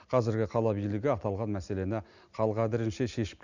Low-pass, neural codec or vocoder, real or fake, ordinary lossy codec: 7.2 kHz; none; real; none